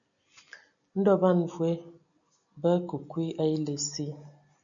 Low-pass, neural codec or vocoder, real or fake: 7.2 kHz; none; real